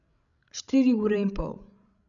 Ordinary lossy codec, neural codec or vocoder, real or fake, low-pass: none; codec, 16 kHz, 16 kbps, FreqCodec, larger model; fake; 7.2 kHz